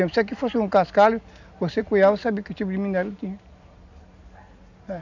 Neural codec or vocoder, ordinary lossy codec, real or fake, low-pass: none; none; real; 7.2 kHz